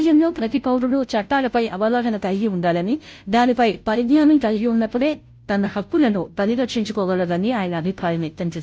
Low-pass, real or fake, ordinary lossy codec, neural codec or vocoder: none; fake; none; codec, 16 kHz, 0.5 kbps, FunCodec, trained on Chinese and English, 25 frames a second